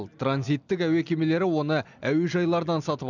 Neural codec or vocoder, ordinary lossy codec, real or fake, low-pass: none; none; real; 7.2 kHz